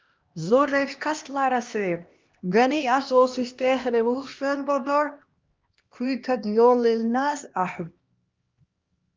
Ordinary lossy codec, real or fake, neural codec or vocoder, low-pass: Opus, 24 kbps; fake; codec, 16 kHz, 1 kbps, X-Codec, HuBERT features, trained on LibriSpeech; 7.2 kHz